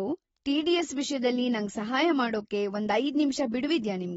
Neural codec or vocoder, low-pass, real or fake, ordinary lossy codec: none; 10.8 kHz; real; AAC, 24 kbps